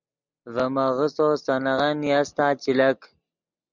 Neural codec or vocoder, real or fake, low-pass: none; real; 7.2 kHz